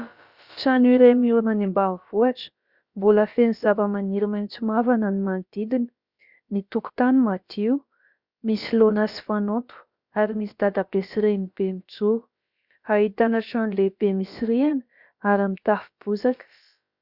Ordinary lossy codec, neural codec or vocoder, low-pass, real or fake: Opus, 64 kbps; codec, 16 kHz, about 1 kbps, DyCAST, with the encoder's durations; 5.4 kHz; fake